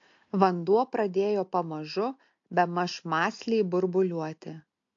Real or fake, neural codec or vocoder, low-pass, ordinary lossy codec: real; none; 7.2 kHz; AAC, 48 kbps